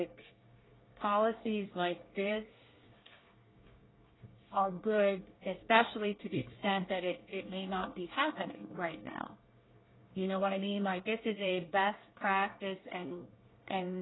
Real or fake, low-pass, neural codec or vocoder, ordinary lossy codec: fake; 7.2 kHz; codec, 24 kHz, 1 kbps, SNAC; AAC, 16 kbps